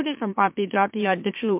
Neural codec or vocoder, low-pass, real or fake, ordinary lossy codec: autoencoder, 44.1 kHz, a latent of 192 numbers a frame, MeloTTS; 3.6 kHz; fake; MP3, 32 kbps